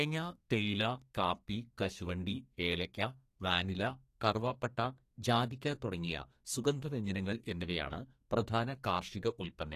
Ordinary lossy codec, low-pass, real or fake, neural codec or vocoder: AAC, 48 kbps; 14.4 kHz; fake; codec, 32 kHz, 1.9 kbps, SNAC